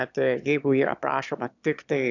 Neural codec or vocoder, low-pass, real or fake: autoencoder, 22.05 kHz, a latent of 192 numbers a frame, VITS, trained on one speaker; 7.2 kHz; fake